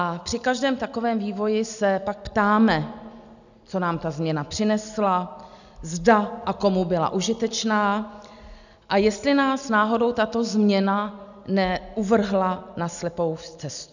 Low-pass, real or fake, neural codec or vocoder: 7.2 kHz; real; none